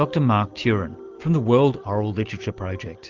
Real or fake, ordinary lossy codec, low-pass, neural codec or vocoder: real; Opus, 32 kbps; 7.2 kHz; none